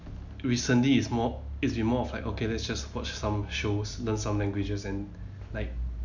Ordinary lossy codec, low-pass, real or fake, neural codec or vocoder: none; 7.2 kHz; real; none